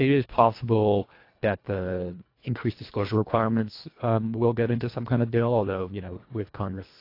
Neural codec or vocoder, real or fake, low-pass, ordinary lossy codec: codec, 24 kHz, 1.5 kbps, HILCodec; fake; 5.4 kHz; AAC, 32 kbps